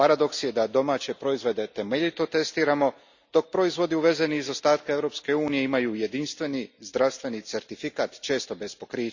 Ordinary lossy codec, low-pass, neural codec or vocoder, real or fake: Opus, 64 kbps; 7.2 kHz; none; real